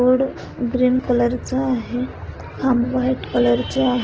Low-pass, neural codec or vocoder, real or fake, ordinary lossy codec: 7.2 kHz; none; real; Opus, 16 kbps